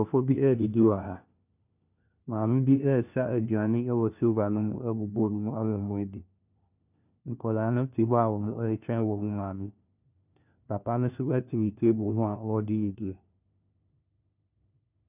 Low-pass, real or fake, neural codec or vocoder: 3.6 kHz; fake; codec, 16 kHz, 1 kbps, FunCodec, trained on LibriTTS, 50 frames a second